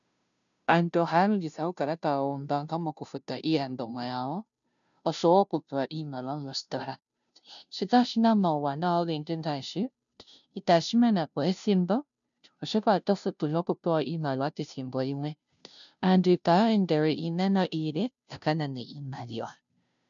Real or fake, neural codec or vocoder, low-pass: fake; codec, 16 kHz, 0.5 kbps, FunCodec, trained on Chinese and English, 25 frames a second; 7.2 kHz